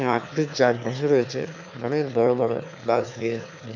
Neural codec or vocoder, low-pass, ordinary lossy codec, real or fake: autoencoder, 22.05 kHz, a latent of 192 numbers a frame, VITS, trained on one speaker; 7.2 kHz; none; fake